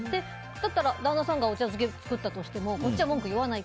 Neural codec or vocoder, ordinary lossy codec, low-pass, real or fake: none; none; none; real